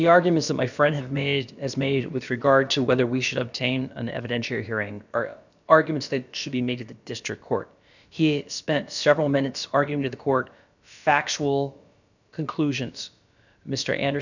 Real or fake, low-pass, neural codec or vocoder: fake; 7.2 kHz; codec, 16 kHz, about 1 kbps, DyCAST, with the encoder's durations